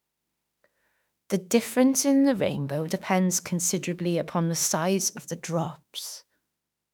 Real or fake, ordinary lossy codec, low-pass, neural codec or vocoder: fake; none; none; autoencoder, 48 kHz, 32 numbers a frame, DAC-VAE, trained on Japanese speech